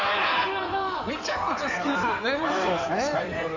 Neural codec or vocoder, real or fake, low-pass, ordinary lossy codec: codec, 16 kHz in and 24 kHz out, 2.2 kbps, FireRedTTS-2 codec; fake; 7.2 kHz; none